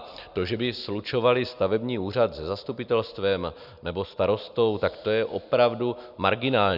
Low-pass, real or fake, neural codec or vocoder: 5.4 kHz; real; none